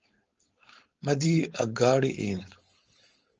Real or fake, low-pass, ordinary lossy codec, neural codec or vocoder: fake; 7.2 kHz; Opus, 24 kbps; codec, 16 kHz, 4.8 kbps, FACodec